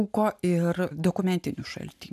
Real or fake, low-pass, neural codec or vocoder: real; 14.4 kHz; none